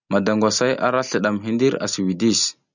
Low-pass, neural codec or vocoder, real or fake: 7.2 kHz; none; real